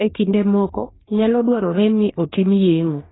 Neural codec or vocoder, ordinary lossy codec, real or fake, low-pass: codec, 44.1 kHz, 2.6 kbps, DAC; AAC, 16 kbps; fake; 7.2 kHz